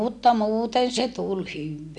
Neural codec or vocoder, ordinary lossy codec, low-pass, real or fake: none; none; 10.8 kHz; real